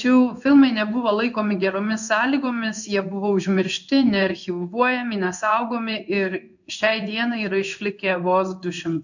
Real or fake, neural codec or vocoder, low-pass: fake; codec, 16 kHz in and 24 kHz out, 1 kbps, XY-Tokenizer; 7.2 kHz